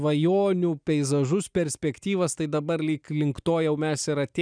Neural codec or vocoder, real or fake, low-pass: none; real; 9.9 kHz